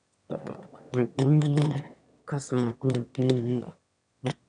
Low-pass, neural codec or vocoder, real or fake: 9.9 kHz; autoencoder, 22.05 kHz, a latent of 192 numbers a frame, VITS, trained on one speaker; fake